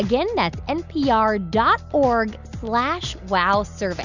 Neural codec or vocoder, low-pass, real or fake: none; 7.2 kHz; real